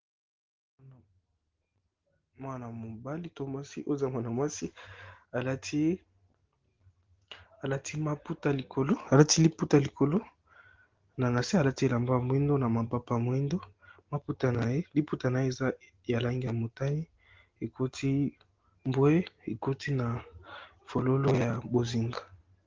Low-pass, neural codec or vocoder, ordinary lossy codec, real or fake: 7.2 kHz; none; Opus, 16 kbps; real